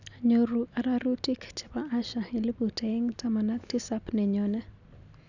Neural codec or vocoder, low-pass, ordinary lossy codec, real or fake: none; 7.2 kHz; none; real